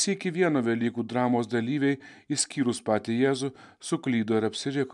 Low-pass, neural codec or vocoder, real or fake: 10.8 kHz; none; real